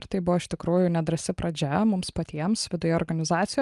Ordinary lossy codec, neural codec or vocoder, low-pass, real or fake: Opus, 32 kbps; none; 10.8 kHz; real